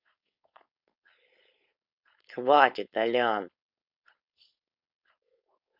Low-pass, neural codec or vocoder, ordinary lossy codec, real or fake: 5.4 kHz; codec, 16 kHz, 4.8 kbps, FACodec; Opus, 64 kbps; fake